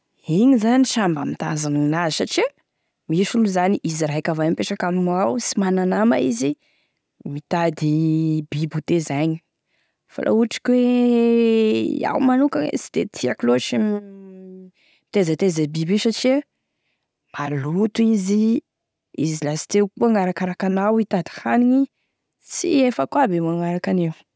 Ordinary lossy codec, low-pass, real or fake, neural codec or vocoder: none; none; real; none